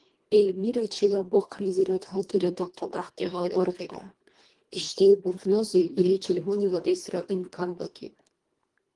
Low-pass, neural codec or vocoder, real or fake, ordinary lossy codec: 10.8 kHz; codec, 24 kHz, 1.5 kbps, HILCodec; fake; Opus, 24 kbps